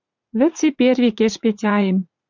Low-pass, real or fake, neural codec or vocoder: 7.2 kHz; fake; vocoder, 44.1 kHz, 80 mel bands, Vocos